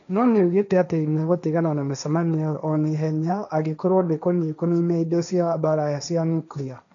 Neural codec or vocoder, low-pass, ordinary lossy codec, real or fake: codec, 16 kHz, 1.1 kbps, Voila-Tokenizer; 7.2 kHz; none; fake